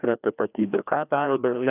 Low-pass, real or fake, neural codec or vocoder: 3.6 kHz; fake; codec, 16 kHz, 1 kbps, FreqCodec, larger model